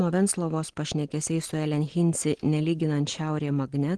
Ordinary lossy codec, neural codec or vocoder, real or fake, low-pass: Opus, 16 kbps; none; real; 10.8 kHz